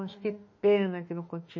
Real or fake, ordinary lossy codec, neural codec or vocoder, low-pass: fake; MP3, 32 kbps; autoencoder, 48 kHz, 32 numbers a frame, DAC-VAE, trained on Japanese speech; 7.2 kHz